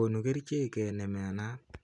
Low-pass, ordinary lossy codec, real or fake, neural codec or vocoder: 10.8 kHz; none; real; none